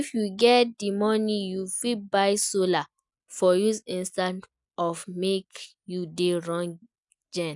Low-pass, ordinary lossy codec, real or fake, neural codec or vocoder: 10.8 kHz; none; real; none